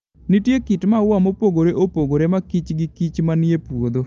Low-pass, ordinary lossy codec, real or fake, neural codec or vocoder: 7.2 kHz; Opus, 32 kbps; real; none